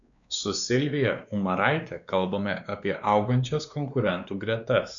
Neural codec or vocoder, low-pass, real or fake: codec, 16 kHz, 2 kbps, X-Codec, WavLM features, trained on Multilingual LibriSpeech; 7.2 kHz; fake